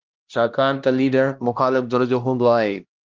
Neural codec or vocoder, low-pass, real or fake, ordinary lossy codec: codec, 16 kHz, 1 kbps, X-Codec, HuBERT features, trained on LibriSpeech; 7.2 kHz; fake; Opus, 32 kbps